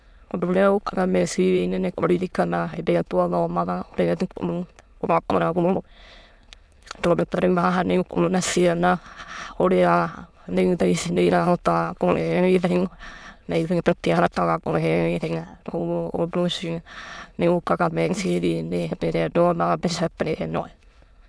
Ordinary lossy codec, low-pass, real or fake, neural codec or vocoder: none; none; fake; autoencoder, 22.05 kHz, a latent of 192 numbers a frame, VITS, trained on many speakers